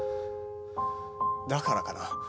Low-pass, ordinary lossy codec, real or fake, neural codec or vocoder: none; none; real; none